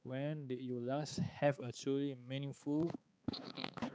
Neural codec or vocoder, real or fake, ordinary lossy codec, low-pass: codec, 16 kHz, 4 kbps, X-Codec, HuBERT features, trained on balanced general audio; fake; none; none